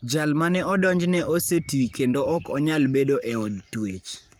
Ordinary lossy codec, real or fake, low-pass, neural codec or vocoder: none; fake; none; codec, 44.1 kHz, 7.8 kbps, Pupu-Codec